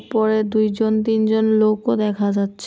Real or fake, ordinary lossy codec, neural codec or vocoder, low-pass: real; none; none; none